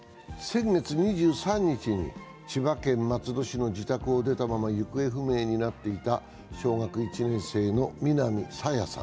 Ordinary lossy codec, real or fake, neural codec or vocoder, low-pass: none; real; none; none